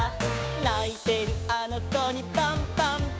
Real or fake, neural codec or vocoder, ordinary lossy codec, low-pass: fake; codec, 16 kHz, 6 kbps, DAC; none; none